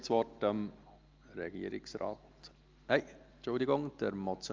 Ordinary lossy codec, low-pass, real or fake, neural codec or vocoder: Opus, 24 kbps; 7.2 kHz; real; none